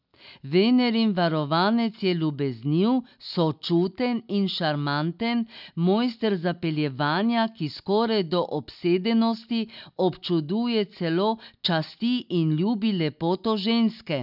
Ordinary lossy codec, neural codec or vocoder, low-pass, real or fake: none; none; 5.4 kHz; real